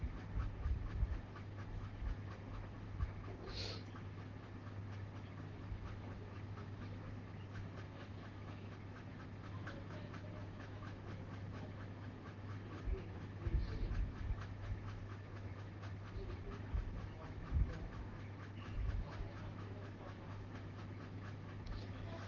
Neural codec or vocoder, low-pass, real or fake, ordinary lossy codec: autoencoder, 48 kHz, 128 numbers a frame, DAC-VAE, trained on Japanese speech; 7.2 kHz; fake; Opus, 16 kbps